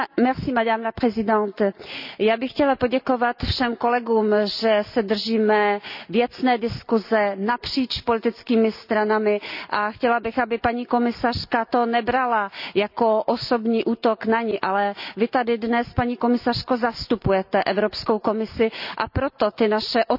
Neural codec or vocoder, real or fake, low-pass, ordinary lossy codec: none; real; 5.4 kHz; none